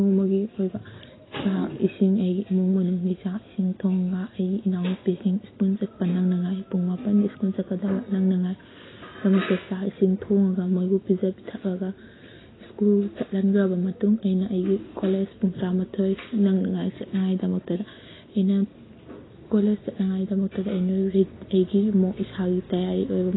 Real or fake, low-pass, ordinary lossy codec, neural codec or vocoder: real; 7.2 kHz; AAC, 16 kbps; none